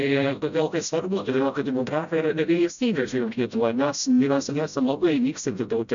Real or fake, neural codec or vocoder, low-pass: fake; codec, 16 kHz, 0.5 kbps, FreqCodec, smaller model; 7.2 kHz